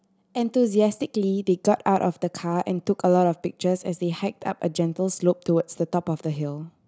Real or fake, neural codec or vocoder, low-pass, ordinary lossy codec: real; none; none; none